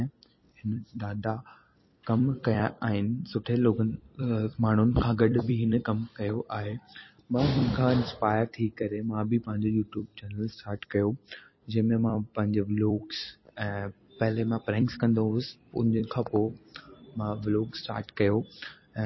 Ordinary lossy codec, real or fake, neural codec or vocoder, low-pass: MP3, 24 kbps; fake; vocoder, 44.1 kHz, 128 mel bands every 256 samples, BigVGAN v2; 7.2 kHz